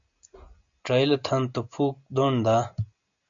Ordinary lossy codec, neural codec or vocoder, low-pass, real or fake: MP3, 64 kbps; none; 7.2 kHz; real